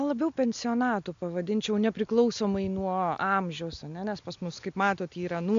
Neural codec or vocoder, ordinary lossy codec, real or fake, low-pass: none; AAC, 64 kbps; real; 7.2 kHz